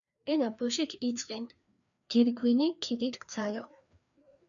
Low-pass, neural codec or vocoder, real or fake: 7.2 kHz; codec, 16 kHz, 2 kbps, FreqCodec, larger model; fake